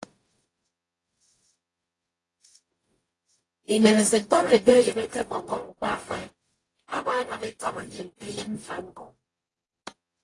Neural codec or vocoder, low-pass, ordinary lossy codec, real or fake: codec, 44.1 kHz, 0.9 kbps, DAC; 10.8 kHz; AAC, 32 kbps; fake